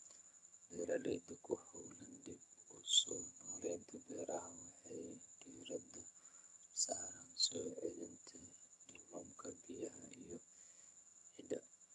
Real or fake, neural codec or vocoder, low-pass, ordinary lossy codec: fake; vocoder, 22.05 kHz, 80 mel bands, HiFi-GAN; none; none